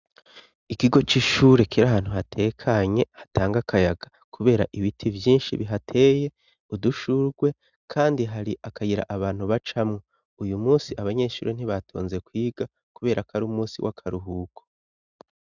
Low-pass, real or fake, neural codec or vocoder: 7.2 kHz; real; none